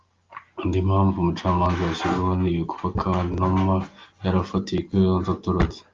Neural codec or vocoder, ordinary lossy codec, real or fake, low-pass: none; Opus, 32 kbps; real; 7.2 kHz